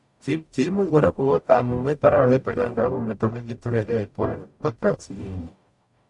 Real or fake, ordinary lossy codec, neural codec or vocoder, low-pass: fake; MP3, 64 kbps; codec, 44.1 kHz, 0.9 kbps, DAC; 10.8 kHz